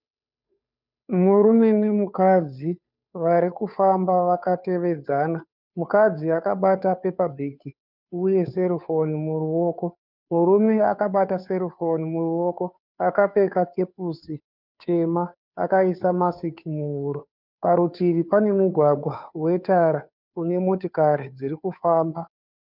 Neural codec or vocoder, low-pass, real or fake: codec, 16 kHz, 2 kbps, FunCodec, trained on Chinese and English, 25 frames a second; 5.4 kHz; fake